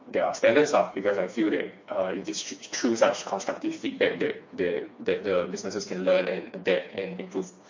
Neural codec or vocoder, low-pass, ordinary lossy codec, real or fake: codec, 16 kHz, 2 kbps, FreqCodec, smaller model; 7.2 kHz; MP3, 48 kbps; fake